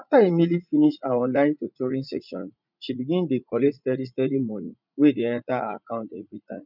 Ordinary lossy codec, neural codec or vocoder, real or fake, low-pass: none; vocoder, 44.1 kHz, 80 mel bands, Vocos; fake; 5.4 kHz